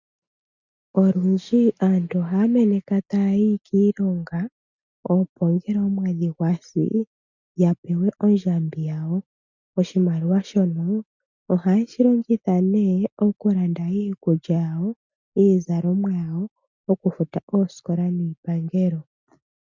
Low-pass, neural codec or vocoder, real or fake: 7.2 kHz; none; real